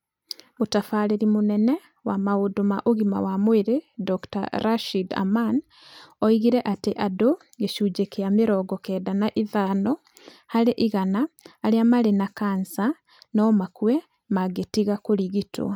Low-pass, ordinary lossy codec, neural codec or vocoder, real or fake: 19.8 kHz; none; none; real